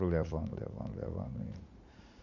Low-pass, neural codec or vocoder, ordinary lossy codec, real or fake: 7.2 kHz; vocoder, 44.1 kHz, 80 mel bands, Vocos; none; fake